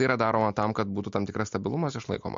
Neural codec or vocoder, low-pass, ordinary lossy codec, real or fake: none; 7.2 kHz; MP3, 48 kbps; real